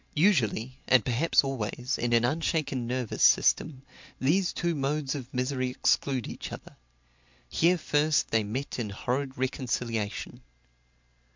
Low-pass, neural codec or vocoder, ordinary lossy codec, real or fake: 7.2 kHz; none; MP3, 64 kbps; real